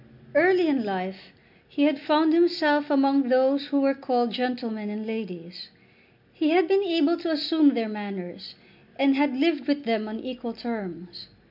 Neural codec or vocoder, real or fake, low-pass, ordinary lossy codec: none; real; 5.4 kHz; MP3, 48 kbps